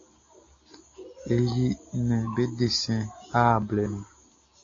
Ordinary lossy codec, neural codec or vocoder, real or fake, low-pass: AAC, 32 kbps; none; real; 7.2 kHz